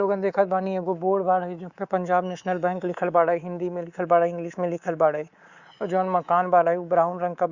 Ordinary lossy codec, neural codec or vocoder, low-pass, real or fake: Opus, 64 kbps; codec, 24 kHz, 3.1 kbps, DualCodec; 7.2 kHz; fake